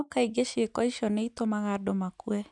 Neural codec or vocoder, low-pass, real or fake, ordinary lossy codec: none; 10.8 kHz; real; none